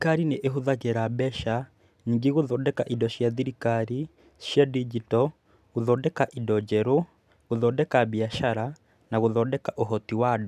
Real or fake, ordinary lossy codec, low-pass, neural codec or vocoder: real; none; 14.4 kHz; none